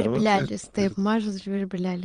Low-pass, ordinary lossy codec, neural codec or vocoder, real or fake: 9.9 kHz; Opus, 32 kbps; vocoder, 22.05 kHz, 80 mel bands, Vocos; fake